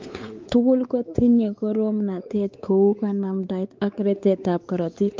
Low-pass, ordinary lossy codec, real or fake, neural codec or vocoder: 7.2 kHz; Opus, 24 kbps; fake; codec, 16 kHz, 4 kbps, X-Codec, WavLM features, trained on Multilingual LibriSpeech